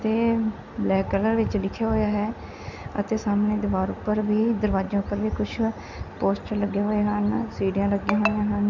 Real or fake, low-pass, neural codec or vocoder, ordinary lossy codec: real; 7.2 kHz; none; none